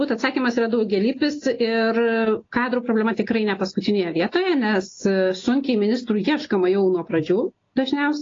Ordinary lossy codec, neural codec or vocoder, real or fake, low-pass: AAC, 32 kbps; none; real; 7.2 kHz